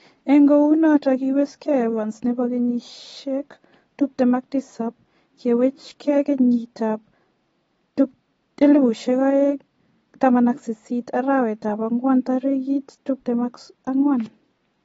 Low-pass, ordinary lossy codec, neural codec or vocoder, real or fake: 19.8 kHz; AAC, 24 kbps; none; real